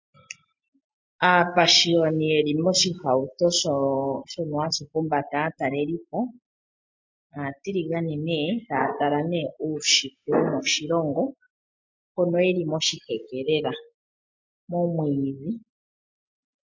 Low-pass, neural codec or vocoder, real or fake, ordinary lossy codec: 7.2 kHz; none; real; MP3, 48 kbps